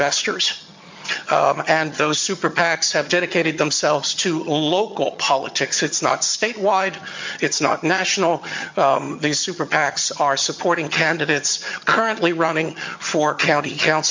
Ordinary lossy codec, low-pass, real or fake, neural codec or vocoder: MP3, 48 kbps; 7.2 kHz; fake; vocoder, 22.05 kHz, 80 mel bands, HiFi-GAN